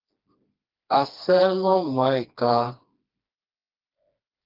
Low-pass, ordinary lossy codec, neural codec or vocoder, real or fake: 5.4 kHz; Opus, 32 kbps; codec, 16 kHz, 2 kbps, FreqCodec, smaller model; fake